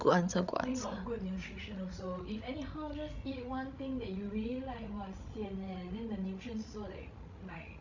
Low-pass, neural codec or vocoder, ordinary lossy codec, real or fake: 7.2 kHz; codec, 16 kHz, 16 kbps, FreqCodec, larger model; none; fake